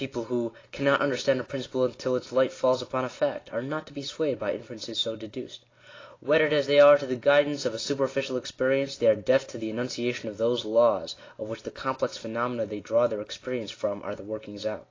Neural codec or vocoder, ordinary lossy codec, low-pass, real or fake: none; AAC, 32 kbps; 7.2 kHz; real